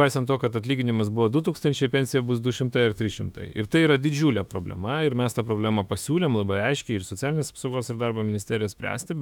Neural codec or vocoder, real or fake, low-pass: autoencoder, 48 kHz, 32 numbers a frame, DAC-VAE, trained on Japanese speech; fake; 19.8 kHz